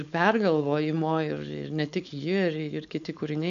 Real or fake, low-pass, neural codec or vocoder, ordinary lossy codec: fake; 7.2 kHz; codec, 16 kHz, 4.8 kbps, FACodec; AAC, 96 kbps